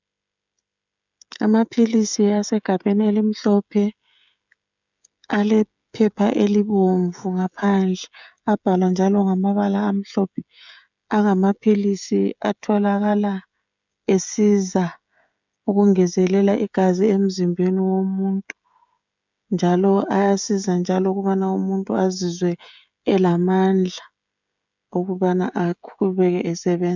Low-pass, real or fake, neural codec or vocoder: 7.2 kHz; fake; codec, 16 kHz, 16 kbps, FreqCodec, smaller model